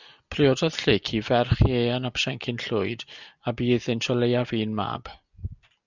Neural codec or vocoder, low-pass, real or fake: none; 7.2 kHz; real